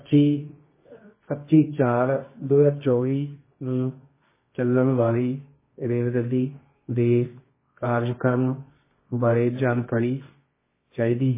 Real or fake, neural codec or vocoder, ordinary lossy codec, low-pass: fake; codec, 24 kHz, 0.9 kbps, WavTokenizer, medium music audio release; MP3, 16 kbps; 3.6 kHz